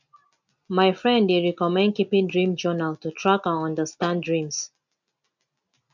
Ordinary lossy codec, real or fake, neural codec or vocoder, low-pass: none; real; none; 7.2 kHz